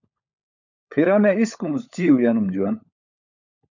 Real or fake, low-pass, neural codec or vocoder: fake; 7.2 kHz; codec, 16 kHz, 16 kbps, FunCodec, trained on LibriTTS, 50 frames a second